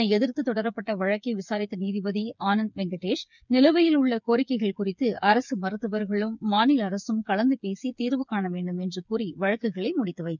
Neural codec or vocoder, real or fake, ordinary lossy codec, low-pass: codec, 16 kHz, 8 kbps, FreqCodec, smaller model; fake; none; 7.2 kHz